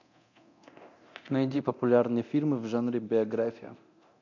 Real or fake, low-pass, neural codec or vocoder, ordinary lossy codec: fake; 7.2 kHz; codec, 24 kHz, 0.9 kbps, DualCodec; AAC, 48 kbps